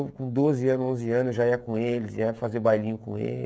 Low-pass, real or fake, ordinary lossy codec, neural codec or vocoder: none; fake; none; codec, 16 kHz, 16 kbps, FreqCodec, smaller model